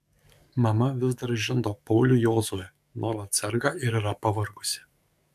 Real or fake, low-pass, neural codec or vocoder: fake; 14.4 kHz; codec, 44.1 kHz, 7.8 kbps, DAC